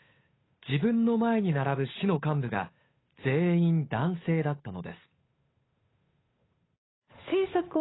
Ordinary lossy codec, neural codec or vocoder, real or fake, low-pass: AAC, 16 kbps; codec, 16 kHz, 8 kbps, FunCodec, trained on Chinese and English, 25 frames a second; fake; 7.2 kHz